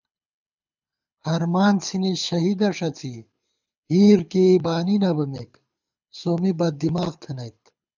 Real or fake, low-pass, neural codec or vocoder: fake; 7.2 kHz; codec, 24 kHz, 6 kbps, HILCodec